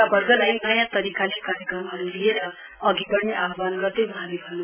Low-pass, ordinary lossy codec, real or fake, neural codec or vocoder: 3.6 kHz; none; real; none